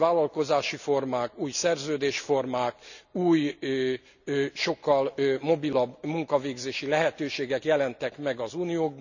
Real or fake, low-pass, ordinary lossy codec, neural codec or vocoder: real; 7.2 kHz; none; none